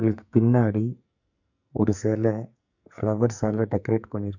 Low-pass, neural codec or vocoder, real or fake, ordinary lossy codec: 7.2 kHz; codec, 44.1 kHz, 2.6 kbps, SNAC; fake; none